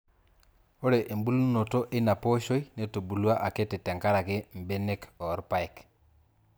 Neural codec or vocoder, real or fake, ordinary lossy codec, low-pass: none; real; none; none